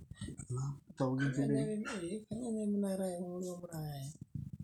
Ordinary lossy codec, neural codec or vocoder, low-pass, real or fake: none; none; 19.8 kHz; real